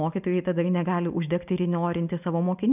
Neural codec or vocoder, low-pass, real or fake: none; 3.6 kHz; real